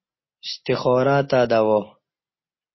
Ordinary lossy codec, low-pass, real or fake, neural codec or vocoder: MP3, 24 kbps; 7.2 kHz; real; none